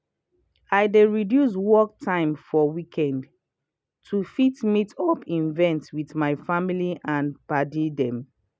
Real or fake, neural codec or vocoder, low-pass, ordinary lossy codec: real; none; none; none